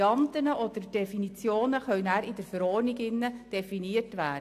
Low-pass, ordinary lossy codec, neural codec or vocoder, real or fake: 14.4 kHz; AAC, 64 kbps; none; real